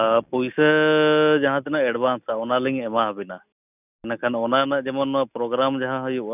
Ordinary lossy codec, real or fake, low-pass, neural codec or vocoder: none; real; 3.6 kHz; none